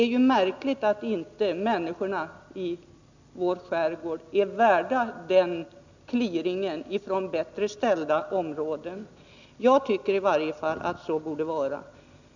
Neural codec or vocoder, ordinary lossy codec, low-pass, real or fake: none; none; 7.2 kHz; real